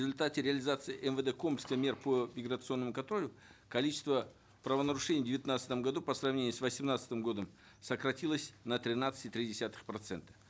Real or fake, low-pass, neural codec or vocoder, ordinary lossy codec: real; none; none; none